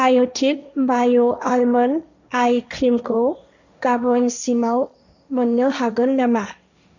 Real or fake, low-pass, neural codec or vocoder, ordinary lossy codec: fake; 7.2 kHz; codec, 16 kHz, 1.1 kbps, Voila-Tokenizer; none